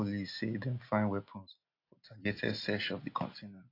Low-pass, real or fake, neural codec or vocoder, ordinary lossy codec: 5.4 kHz; real; none; AAC, 32 kbps